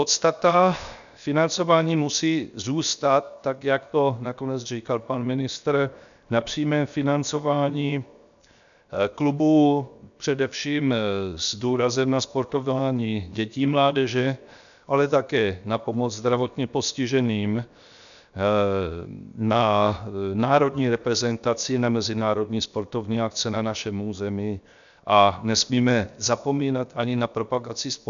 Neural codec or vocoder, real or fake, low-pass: codec, 16 kHz, 0.7 kbps, FocalCodec; fake; 7.2 kHz